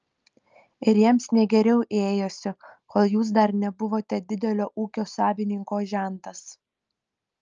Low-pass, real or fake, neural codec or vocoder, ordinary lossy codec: 7.2 kHz; real; none; Opus, 24 kbps